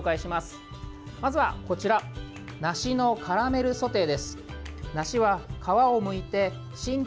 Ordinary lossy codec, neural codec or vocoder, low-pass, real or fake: none; none; none; real